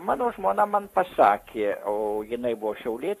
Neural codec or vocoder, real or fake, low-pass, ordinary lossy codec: codec, 44.1 kHz, 7.8 kbps, Pupu-Codec; fake; 14.4 kHz; AAC, 64 kbps